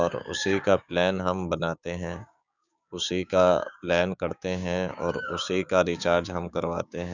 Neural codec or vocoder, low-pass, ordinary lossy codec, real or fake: codec, 16 kHz, 6 kbps, DAC; 7.2 kHz; none; fake